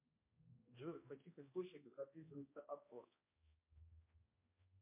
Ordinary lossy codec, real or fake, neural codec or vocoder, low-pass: MP3, 24 kbps; fake; codec, 16 kHz, 1 kbps, X-Codec, HuBERT features, trained on balanced general audio; 3.6 kHz